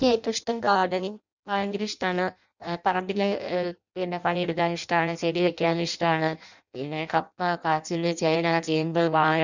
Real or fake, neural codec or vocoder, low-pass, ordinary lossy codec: fake; codec, 16 kHz in and 24 kHz out, 0.6 kbps, FireRedTTS-2 codec; 7.2 kHz; none